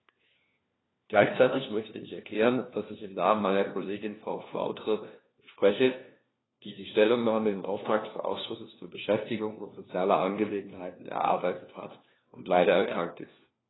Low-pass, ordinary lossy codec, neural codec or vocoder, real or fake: 7.2 kHz; AAC, 16 kbps; codec, 16 kHz, 1 kbps, FunCodec, trained on LibriTTS, 50 frames a second; fake